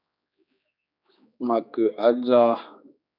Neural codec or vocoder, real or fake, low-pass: codec, 16 kHz, 2 kbps, X-Codec, HuBERT features, trained on general audio; fake; 5.4 kHz